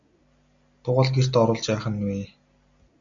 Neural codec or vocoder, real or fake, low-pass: none; real; 7.2 kHz